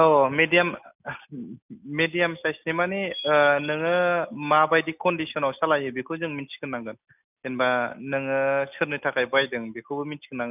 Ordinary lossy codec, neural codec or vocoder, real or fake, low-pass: none; none; real; 3.6 kHz